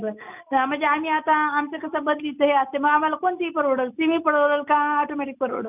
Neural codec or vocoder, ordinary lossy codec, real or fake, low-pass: none; none; real; 3.6 kHz